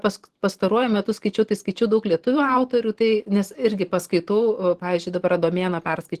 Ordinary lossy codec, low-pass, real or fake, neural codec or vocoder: Opus, 16 kbps; 14.4 kHz; fake; vocoder, 44.1 kHz, 128 mel bands every 512 samples, BigVGAN v2